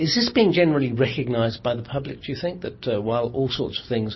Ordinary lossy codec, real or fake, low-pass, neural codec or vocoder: MP3, 24 kbps; real; 7.2 kHz; none